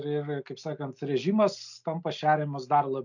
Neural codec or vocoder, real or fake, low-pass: none; real; 7.2 kHz